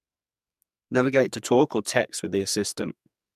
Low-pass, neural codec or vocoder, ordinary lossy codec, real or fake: 14.4 kHz; codec, 44.1 kHz, 2.6 kbps, SNAC; MP3, 96 kbps; fake